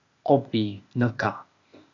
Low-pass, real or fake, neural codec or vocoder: 7.2 kHz; fake; codec, 16 kHz, 0.8 kbps, ZipCodec